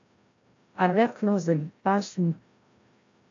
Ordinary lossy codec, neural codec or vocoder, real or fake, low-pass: AAC, 48 kbps; codec, 16 kHz, 0.5 kbps, FreqCodec, larger model; fake; 7.2 kHz